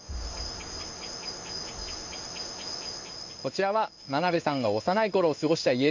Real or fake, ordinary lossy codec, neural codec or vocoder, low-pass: fake; MP3, 48 kbps; codec, 16 kHz in and 24 kHz out, 1 kbps, XY-Tokenizer; 7.2 kHz